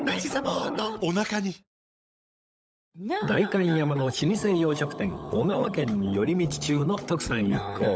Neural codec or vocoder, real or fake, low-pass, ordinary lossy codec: codec, 16 kHz, 16 kbps, FunCodec, trained on LibriTTS, 50 frames a second; fake; none; none